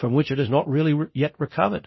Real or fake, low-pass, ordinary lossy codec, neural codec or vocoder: fake; 7.2 kHz; MP3, 24 kbps; codec, 24 kHz, 0.9 kbps, DualCodec